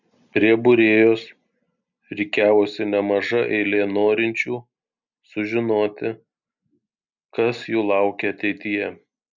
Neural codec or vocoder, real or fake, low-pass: none; real; 7.2 kHz